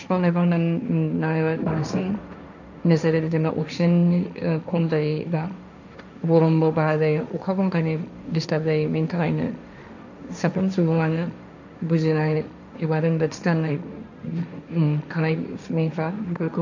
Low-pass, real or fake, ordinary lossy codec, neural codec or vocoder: 7.2 kHz; fake; none; codec, 16 kHz, 1.1 kbps, Voila-Tokenizer